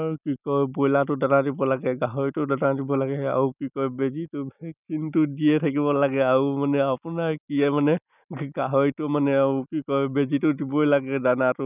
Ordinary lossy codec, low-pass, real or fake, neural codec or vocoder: none; 3.6 kHz; real; none